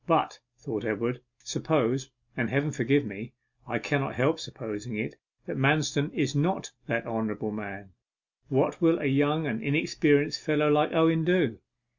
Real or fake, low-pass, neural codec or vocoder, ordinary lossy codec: real; 7.2 kHz; none; MP3, 64 kbps